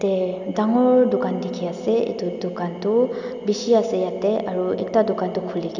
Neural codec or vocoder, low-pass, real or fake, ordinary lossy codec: none; 7.2 kHz; real; none